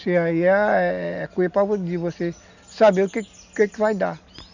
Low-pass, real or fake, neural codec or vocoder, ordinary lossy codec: 7.2 kHz; real; none; none